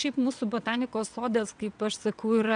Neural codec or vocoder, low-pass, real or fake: vocoder, 22.05 kHz, 80 mel bands, WaveNeXt; 9.9 kHz; fake